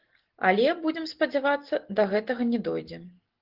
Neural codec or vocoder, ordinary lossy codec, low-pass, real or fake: none; Opus, 16 kbps; 5.4 kHz; real